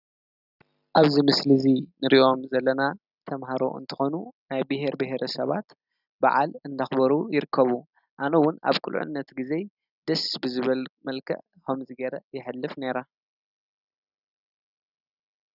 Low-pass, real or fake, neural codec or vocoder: 5.4 kHz; real; none